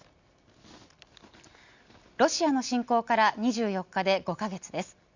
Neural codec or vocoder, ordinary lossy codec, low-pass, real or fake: none; Opus, 64 kbps; 7.2 kHz; real